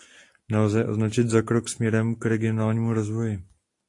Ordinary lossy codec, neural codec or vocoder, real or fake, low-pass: AAC, 48 kbps; none; real; 10.8 kHz